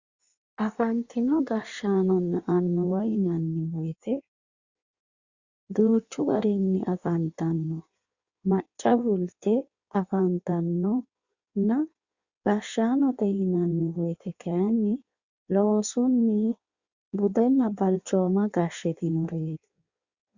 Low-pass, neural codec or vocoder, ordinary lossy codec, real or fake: 7.2 kHz; codec, 16 kHz in and 24 kHz out, 1.1 kbps, FireRedTTS-2 codec; Opus, 64 kbps; fake